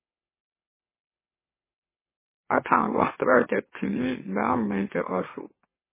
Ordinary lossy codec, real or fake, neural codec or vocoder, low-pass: MP3, 16 kbps; fake; autoencoder, 44.1 kHz, a latent of 192 numbers a frame, MeloTTS; 3.6 kHz